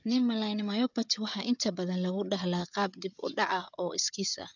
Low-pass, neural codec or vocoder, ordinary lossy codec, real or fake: 7.2 kHz; codec, 16 kHz, 16 kbps, FreqCodec, smaller model; none; fake